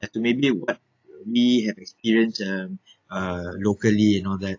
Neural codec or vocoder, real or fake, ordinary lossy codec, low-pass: none; real; AAC, 48 kbps; 7.2 kHz